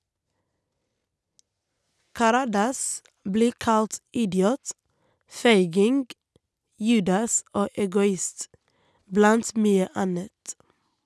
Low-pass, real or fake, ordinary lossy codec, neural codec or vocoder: none; real; none; none